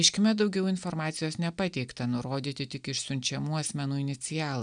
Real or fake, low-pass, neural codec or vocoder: real; 9.9 kHz; none